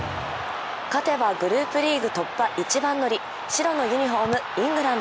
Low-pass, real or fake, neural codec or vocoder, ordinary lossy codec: none; real; none; none